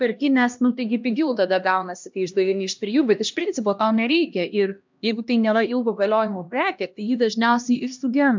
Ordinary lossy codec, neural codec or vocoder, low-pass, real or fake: MP3, 64 kbps; codec, 16 kHz, 1 kbps, X-Codec, HuBERT features, trained on LibriSpeech; 7.2 kHz; fake